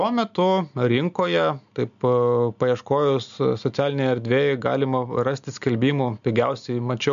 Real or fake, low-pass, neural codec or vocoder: real; 7.2 kHz; none